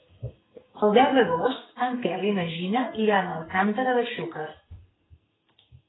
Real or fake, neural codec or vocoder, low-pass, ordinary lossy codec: fake; codec, 44.1 kHz, 2.6 kbps, SNAC; 7.2 kHz; AAC, 16 kbps